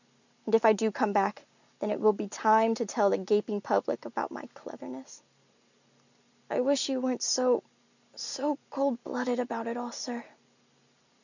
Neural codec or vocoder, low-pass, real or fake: none; 7.2 kHz; real